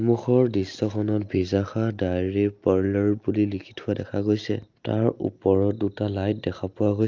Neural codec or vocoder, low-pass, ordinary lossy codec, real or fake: none; 7.2 kHz; Opus, 24 kbps; real